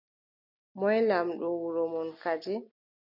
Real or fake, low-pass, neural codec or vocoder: real; 5.4 kHz; none